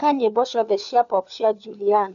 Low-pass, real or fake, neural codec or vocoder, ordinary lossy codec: 7.2 kHz; fake; codec, 16 kHz, 4 kbps, FunCodec, trained on LibriTTS, 50 frames a second; none